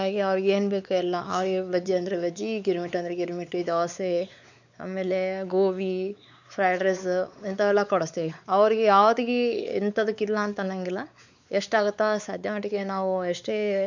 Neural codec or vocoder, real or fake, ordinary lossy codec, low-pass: codec, 16 kHz, 4 kbps, X-Codec, WavLM features, trained on Multilingual LibriSpeech; fake; none; 7.2 kHz